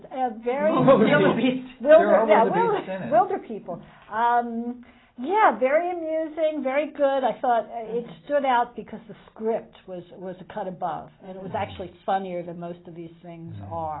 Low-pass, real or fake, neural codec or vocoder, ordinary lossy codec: 7.2 kHz; real; none; AAC, 16 kbps